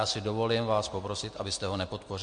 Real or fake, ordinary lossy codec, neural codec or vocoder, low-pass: real; MP3, 48 kbps; none; 9.9 kHz